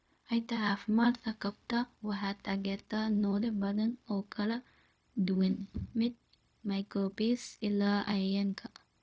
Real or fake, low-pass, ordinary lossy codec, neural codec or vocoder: fake; none; none; codec, 16 kHz, 0.4 kbps, LongCat-Audio-Codec